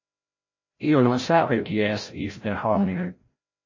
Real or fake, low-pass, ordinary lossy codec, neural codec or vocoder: fake; 7.2 kHz; MP3, 32 kbps; codec, 16 kHz, 0.5 kbps, FreqCodec, larger model